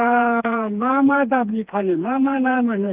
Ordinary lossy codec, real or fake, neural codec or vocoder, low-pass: Opus, 24 kbps; fake; codec, 16 kHz, 2 kbps, FreqCodec, smaller model; 3.6 kHz